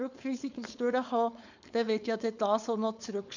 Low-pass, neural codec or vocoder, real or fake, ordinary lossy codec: 7.2 kHz; codec, 16 kHz, 4.8 kbps, FACodec; fake; none